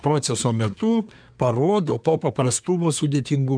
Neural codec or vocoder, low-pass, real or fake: codec, 24 kHz, 1 kbps, SNAC; 9.9 kHz; fake